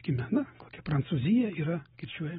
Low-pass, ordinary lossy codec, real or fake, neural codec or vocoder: 19.8 kHz; AAC, 16 kbps; real; none